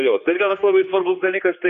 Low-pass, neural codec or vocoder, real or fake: 7.2 kHz; codec, 16 kHz, 2 kbps, X-Codec, HuBERT features, trained on general audio; fake